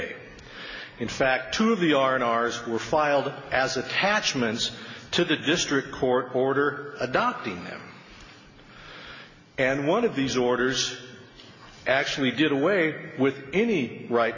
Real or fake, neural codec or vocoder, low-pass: real; none; 7.2 kHz